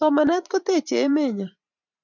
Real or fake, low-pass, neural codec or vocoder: fake; 7.2 kHz; vocoder, 44.1 kHz, 128 mel bands every 512 samples, BigVGAN v2